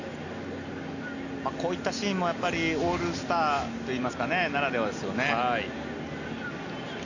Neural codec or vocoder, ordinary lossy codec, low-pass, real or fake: none; none; 7.2 kHz; real